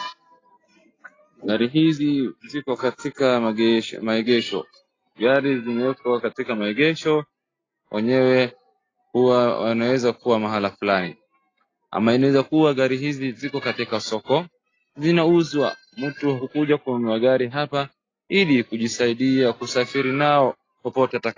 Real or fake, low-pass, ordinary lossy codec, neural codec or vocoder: real; 7.2 kHz; AAC, 32 kbps; none